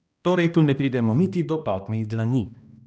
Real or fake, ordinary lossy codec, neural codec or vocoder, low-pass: fake; none; codec, 16 kHz, 1 kbps, X-Codec, HuBERT features, trained on balanced general audio; none